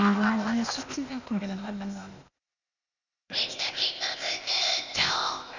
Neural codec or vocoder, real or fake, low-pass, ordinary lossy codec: codec, 16 kHz, 0.8 kbps, ZipCodec; fake; 7.2 kHz; none